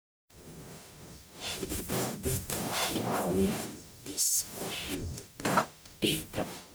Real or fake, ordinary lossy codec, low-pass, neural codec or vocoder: fake; none; none; codec, 44.1 kHz, 0.9 kbps, DAC